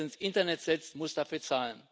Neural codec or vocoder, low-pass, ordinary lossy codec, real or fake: none; none; none; real